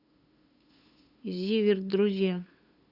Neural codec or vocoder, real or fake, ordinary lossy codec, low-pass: codec, 16 kHz, 8 kbps, FunCodec, trained on LibriTTS, 25 frames a second; fake; Opus, 64 kbps; 5.4 kHz